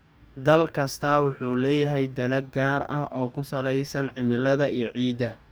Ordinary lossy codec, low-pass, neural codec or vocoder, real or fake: none; none; codec, 44.1 kHz, 2.6 kbps, DAC; fake